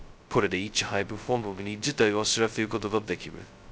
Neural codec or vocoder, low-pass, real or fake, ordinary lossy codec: codec, 16 kHz, 0.2 kbps, FocalCodec; none; fake; none